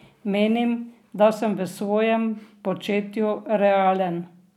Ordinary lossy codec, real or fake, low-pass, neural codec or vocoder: none; real; 19.8 kHz; none